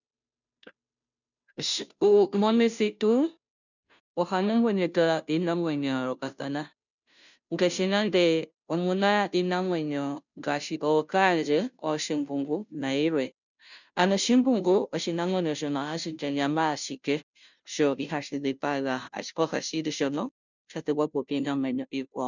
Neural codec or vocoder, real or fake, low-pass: codec, 16 kHz, 0.5 kbps, FunCodec, trained on Chinese and English, 25 frames a second; fake; 7.2 kHz